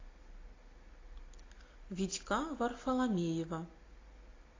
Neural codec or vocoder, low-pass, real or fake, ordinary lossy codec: none; 7.2 kHz; real; AAC, 32 kbps